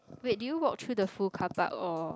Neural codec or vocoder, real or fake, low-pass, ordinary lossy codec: none; real; none; none